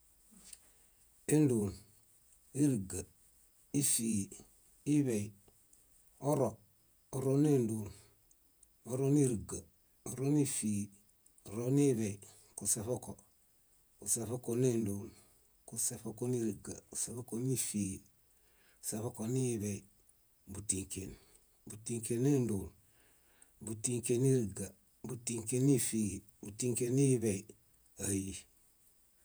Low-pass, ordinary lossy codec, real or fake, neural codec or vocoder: none; none; fake; vocoder, 48 kHz, 128 mel bands, Vocos